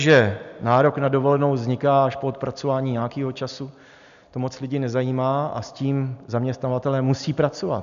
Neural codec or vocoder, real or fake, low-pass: none; real; 7.2 kHz